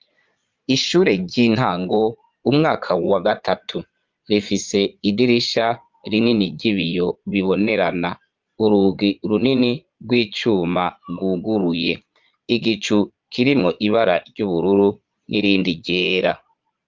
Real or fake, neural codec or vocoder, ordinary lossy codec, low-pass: fake; vocoder, 22.05 kHz, 80 mel bands, Vocos; Opus, 24 kbps; 7.2 kHz